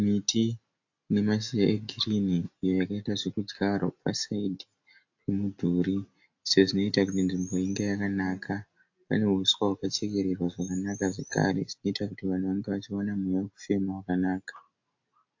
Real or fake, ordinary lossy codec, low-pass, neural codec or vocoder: real; AAC, 48 kbps; 7.2 kHz; none